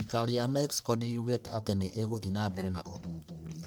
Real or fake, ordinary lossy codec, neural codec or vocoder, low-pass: fake; none; codec, 44.1 kHz, 1.7 kbps, Pupu-Codec; none